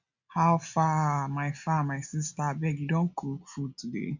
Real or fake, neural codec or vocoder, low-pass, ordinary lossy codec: real; none; 7.2 kHz; none